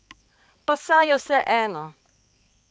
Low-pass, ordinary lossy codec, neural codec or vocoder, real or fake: none; none; codec, 16 kHz, 4 kbps, X-Codec, HuBERT features, trained on balanced general audio; fake